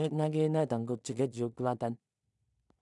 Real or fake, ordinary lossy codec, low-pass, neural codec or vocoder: fake; none; 10.8 kHz; codec, 16 kHz in and 24 kHz out, 0.4 kbps, LongCat-Audio-Codec, two codebook decoder